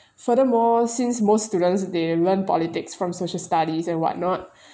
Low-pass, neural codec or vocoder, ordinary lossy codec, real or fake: none; none; none; real